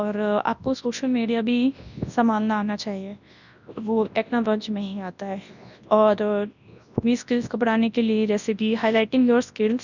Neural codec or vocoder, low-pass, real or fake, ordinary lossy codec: codec, 24 kHz, 0.9 kbps, WavTokenizer, large speech release; 7.2 kHz; fake; none